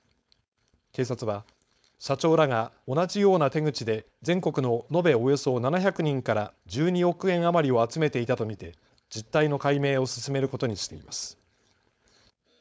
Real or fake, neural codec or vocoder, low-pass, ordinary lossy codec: fake; codec, 16 kHz, 4.8 kbps, FACodec; none; none